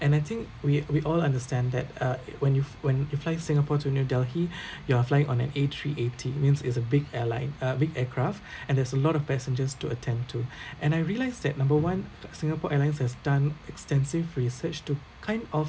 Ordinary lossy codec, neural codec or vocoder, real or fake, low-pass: none; none; real; none